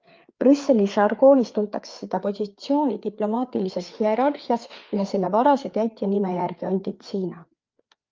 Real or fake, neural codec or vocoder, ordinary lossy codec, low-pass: fake; codec, 16 kHz, 4 kbps, FreqCodec, larger model; Opus, 24 kbps; 7.2 kHz